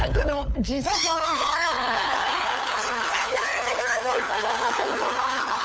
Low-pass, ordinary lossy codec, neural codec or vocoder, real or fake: none; none; codec, 16 kHz, 4 kbps, FunCodec, trained on LibriTTS, 50 frames a second; fake